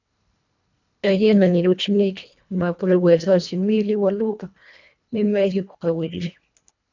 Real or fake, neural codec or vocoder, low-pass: fake; codec, 24 kHz, 1.5 kbps, HILCodec; 7.2 kHz